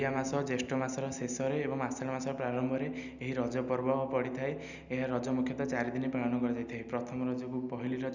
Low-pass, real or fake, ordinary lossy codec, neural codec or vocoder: 7.2 kHz; real; none; none